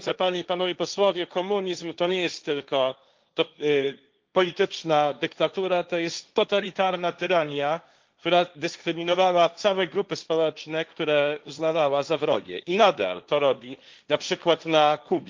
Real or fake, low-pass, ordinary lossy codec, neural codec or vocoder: fake; 7.2 kHz; Opus, 24 kbps; codec, 16 kHz, 1.1 kbps, Voila-Tokenizer